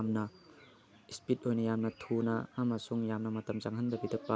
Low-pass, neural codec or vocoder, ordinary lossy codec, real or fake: none; none; none; real